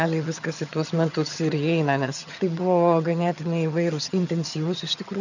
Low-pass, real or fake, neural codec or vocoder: 7.2 kHz; fake; vocoder, 22.05 kHz, 80 mel bands, HiFi-GAN